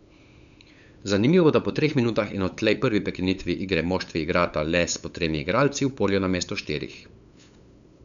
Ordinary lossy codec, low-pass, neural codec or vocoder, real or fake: none; 7.2 kHz; codec, 16 kHz, 8 kbps, FunCodec, trained on LibriTTS, 25 frames a second; fake